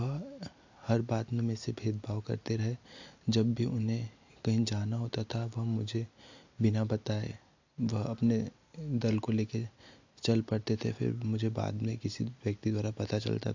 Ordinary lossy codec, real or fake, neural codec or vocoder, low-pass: AAC, 48 kbps; real; none; 7.2 kHz